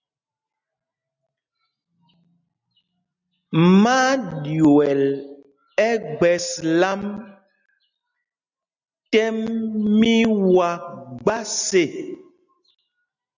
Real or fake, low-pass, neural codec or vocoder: real; 7.2 kHz; none